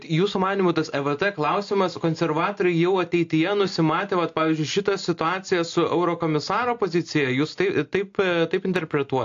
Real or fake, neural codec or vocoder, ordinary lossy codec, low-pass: real; none; AAC, 48 kbps; 7.2 kHz